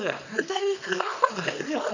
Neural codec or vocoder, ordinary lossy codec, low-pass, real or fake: codec, 24 kHz, 0.9 kbps, WavTokenizer, small release; none; 7.2 kHz; fake